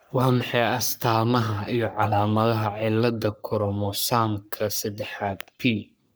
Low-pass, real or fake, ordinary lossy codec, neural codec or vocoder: none; fake; none; codec, 44.1 kHz, 3.4 kbps, Pupu-Codec